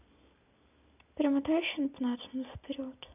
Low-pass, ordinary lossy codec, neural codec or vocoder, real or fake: 3.6 kHz; none; none; real